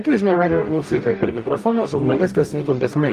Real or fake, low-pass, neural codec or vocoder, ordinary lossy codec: fake; 14.4 kHz; codec, 44.1 kHz, 0.9 kbps, DAC; Opus, 32 kbps